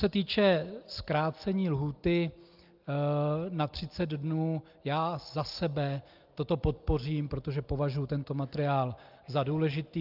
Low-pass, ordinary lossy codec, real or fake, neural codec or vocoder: 5.4 kHz; Opus, 24 kbps; real; none